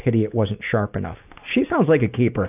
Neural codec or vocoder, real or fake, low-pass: none; real; 3.6 kHz